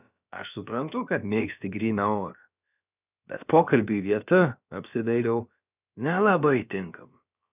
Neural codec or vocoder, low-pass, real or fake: codec, 16 kHz, about 1 kbps, DyCAST, with the encoder's durations; 3.6 kHz; fake